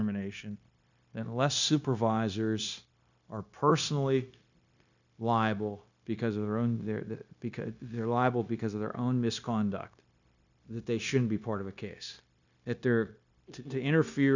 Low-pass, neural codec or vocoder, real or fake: 7.2 kHz; codec, 16 kHz, 0.9 kbps, LongCat-Audio-Codec; fake